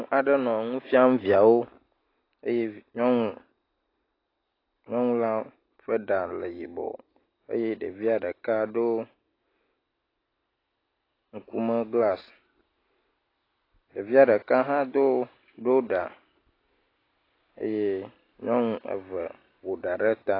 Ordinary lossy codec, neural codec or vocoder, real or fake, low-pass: AAC, 24 kbps; none; real; 5.4 kHz